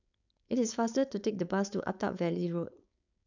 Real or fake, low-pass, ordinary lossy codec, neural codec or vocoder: fake; 7.2 kHz; none; codec, 16 kHz, 4.8 kbps, FACodec